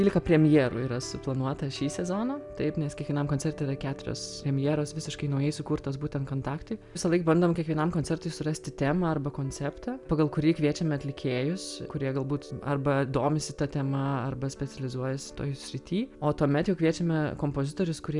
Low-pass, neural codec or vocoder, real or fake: 10.8 kHz; none; real